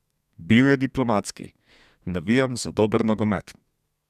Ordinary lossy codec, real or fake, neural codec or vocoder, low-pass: none; fake; codec, 32 kHz, 1.9 kbps, SNAC; 14.4 kHz